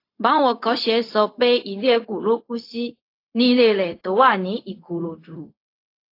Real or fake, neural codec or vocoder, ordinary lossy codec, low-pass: fake; codec, 16 kHz, 0.4 kbps, LongCat-Audio-Codec; AAC, 32 kbps; 5.4 kHz